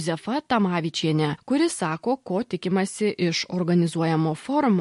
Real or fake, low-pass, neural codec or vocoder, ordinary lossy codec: real; 14.4 kHz; none; MP3, 48 kbps